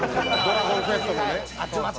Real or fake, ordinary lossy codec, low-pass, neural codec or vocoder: real; none; none; none